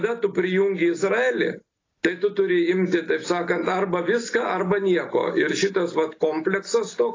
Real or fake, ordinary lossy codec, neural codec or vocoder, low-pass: real; AAC, 32 kbps; none; 7.2 kHz